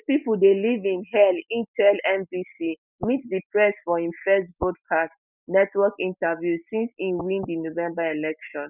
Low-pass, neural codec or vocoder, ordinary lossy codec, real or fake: 3.6 kHz; none; none; real